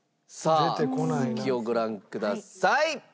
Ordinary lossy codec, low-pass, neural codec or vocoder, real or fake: none; none; none; real